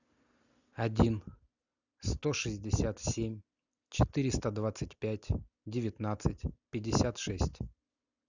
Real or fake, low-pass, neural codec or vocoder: real; 7.2 kHz; none